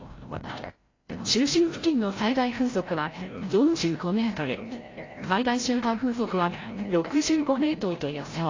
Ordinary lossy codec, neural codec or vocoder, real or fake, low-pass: AAC, 32 kbps; codec, 16 kHz, 0.5 kbps, FreqCodec, larger model; fake; 7.2 kHz